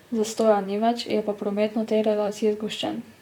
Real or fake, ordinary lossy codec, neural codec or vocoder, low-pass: fake; none; vocoder, 44.1 kHz, 128 mel bands, Pupu-Vocoder; 19.8 kHz